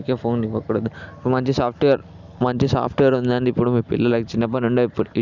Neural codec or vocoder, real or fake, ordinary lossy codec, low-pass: none; real; none; 7.2 kHz